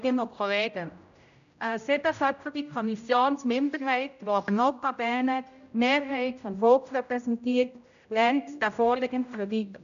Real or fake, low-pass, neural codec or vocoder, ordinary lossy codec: fake; 7.2 kHz; codec, 16 kHz, 0.5 kbps, X-Codec, HuBERT features, trained on general audio; none